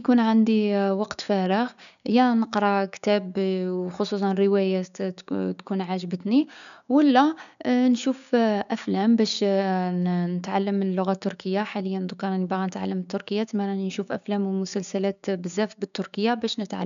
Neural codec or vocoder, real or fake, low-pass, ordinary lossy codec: codec, 16 kHz, 6 kbps, DAC; fake; 7.2 kHz; none